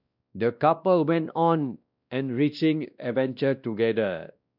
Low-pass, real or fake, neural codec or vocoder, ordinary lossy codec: 5.4 kHz; fake; codec, 16 kHz, 1 kbps, X-Codec, WavLM features, trained on Multilingual LibriSpeech; none